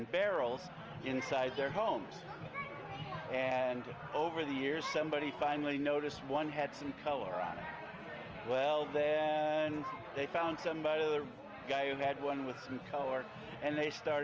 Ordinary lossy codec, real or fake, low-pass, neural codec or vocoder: Opus, 24 kbps; real; 7.2 kHz; none